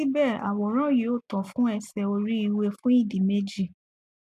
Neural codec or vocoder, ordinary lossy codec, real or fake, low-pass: none; none; real; 14.4 kHz